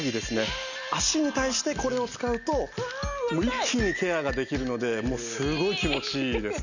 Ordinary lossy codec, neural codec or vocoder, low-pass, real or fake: none; none; 7.2 kHz; real